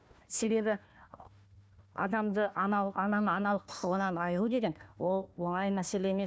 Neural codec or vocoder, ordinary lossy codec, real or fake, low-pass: codec, 16 kHz, 1 kbps, FunCodec, trained on Chinese and English, 50 frames a second; none; fake; none